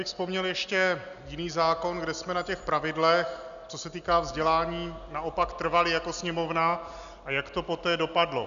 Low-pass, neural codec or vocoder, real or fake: 7.2 kHz; none; real